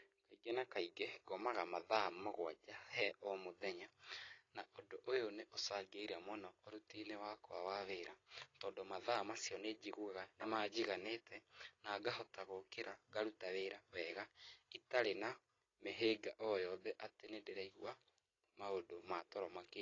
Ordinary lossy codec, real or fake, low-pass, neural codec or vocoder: AAC, 32 kbps; real; 7.2 kHz; none